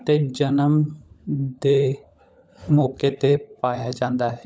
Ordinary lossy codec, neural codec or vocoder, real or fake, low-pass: none; codec, 16 kHz, 16 kbps, FunCodec, trained on LibriTTS, 50 frames a second; fake; none